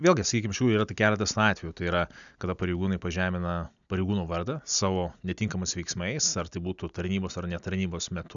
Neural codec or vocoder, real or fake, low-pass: none; real; 7.2 kHz